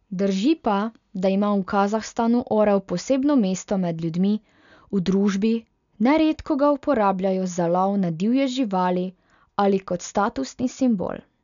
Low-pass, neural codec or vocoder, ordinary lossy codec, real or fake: 7.2 kHz; none; MP3, 96 kbps; real